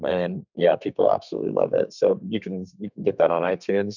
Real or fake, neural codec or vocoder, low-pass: fake; codec, 32 kHz, 1.9 kbps, SNAC; 7.2 kHz